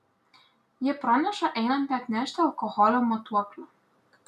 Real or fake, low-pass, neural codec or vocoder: real; 14.4 kHz; none